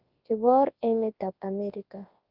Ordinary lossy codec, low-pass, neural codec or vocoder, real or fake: Opus, 32 kbps; 5.4 kHz; codec, 24 kHz, 0.9 kbps, WavTokenizer, large speech release; fake